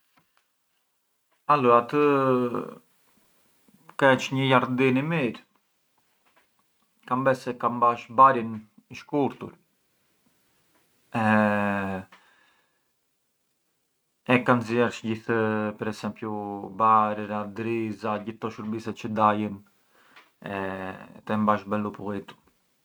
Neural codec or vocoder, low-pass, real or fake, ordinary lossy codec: none; none; real; none